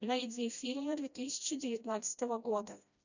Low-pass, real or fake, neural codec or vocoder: 7.2 kHz; fake; codec, 16 kHz, 1 kbps, FreqCodec, smaller model